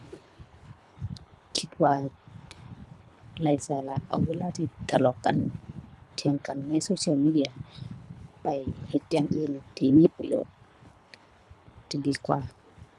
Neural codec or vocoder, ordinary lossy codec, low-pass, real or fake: codec, 24 kHz, 3 kbps, HILCodec; none; none; fake